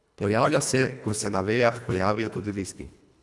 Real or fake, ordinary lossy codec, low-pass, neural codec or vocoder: fake; none; none; codec, 24 kHz, 1.5 kbps, HILCodec